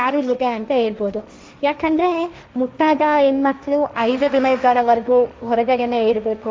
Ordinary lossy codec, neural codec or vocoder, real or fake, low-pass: none; codec, 16 kHz, 1.1 kbps, Voila-Tokenizer; fake; none